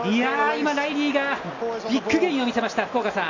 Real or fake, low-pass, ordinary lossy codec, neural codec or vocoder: real; 7.2 kHz; AAC, 48 kbps; none